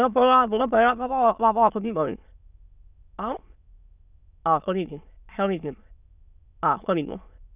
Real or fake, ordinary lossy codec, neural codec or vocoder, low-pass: fake; none; autoencoder, 22.05 kHz, a latent of 192 numbers a frame, VITS, trained on many speakers; 3.6 kHz